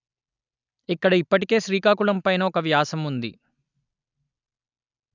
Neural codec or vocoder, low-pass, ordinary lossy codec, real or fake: none; 7.2 kHz; none; real